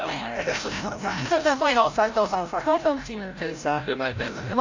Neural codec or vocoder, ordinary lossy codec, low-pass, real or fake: codec, 16 kHz, 0.5 kbps, FreqCodec, larger model; AAC, 48 kbps; 7.2 kHz; fake